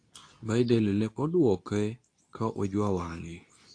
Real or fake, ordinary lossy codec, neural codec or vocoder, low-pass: fake; AAC, 48 kbps; codec, 24 kHz, 0.9 kbps, WavTokenizer, medium speech release version 2; 9.9 kHz